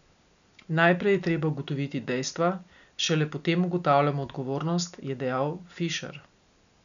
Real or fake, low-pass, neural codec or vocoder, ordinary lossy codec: real; 7.2 kHz; none; none